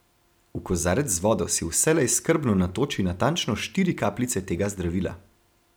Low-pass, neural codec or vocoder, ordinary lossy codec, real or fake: none; none; none; real